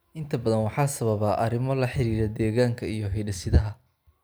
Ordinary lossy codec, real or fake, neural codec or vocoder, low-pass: none; real; none; none